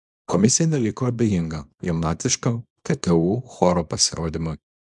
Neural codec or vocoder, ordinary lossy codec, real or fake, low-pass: codec, 24 kHz, 0.9 kbps, WavTokenizer, small release; MP3, 96 kbps; fake; 10.8 kHz